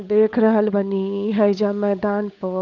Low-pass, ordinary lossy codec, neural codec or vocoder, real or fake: 7.2 kHz; none; codec, 16 kHz, 8 kbps, FunCodec, trained on Chinese and English, 25 frames a second; fake